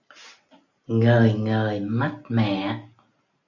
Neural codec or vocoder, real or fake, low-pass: none; real; 7.2 kHz